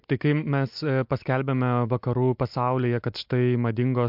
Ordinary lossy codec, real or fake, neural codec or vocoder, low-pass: MP3, 48 kbps; real; none; 5.4 kHz